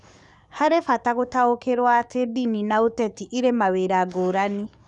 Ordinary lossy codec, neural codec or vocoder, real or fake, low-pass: none; codec, 44.1 kHz, 7.8 kbps, DAC; fake; 10.8 kHz